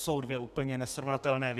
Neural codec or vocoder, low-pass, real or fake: codec, 32 kHz, 1.9 kbps, SNAC; 14.4 kHz; fake